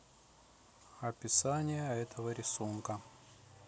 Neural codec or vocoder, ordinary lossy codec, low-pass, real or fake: none; none; none; real